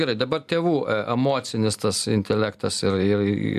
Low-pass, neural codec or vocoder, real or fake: 14.4 kHz; none; real